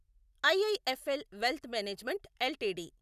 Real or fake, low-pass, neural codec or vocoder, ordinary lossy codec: real; 14.4 kHz; none; none